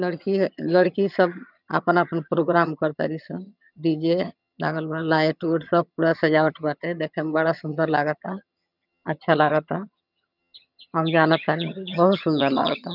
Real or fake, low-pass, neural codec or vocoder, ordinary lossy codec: fake; 5.4 kHz; vocoder, 22.05 kHz, 80 mel bands, HiFi-GAN; none